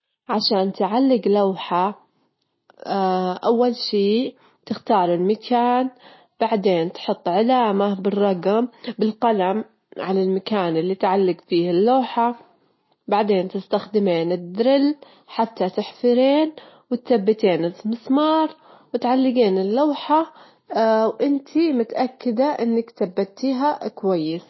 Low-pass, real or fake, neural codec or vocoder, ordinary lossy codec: 7.2 kHz; real; none; MP3, 24 kbps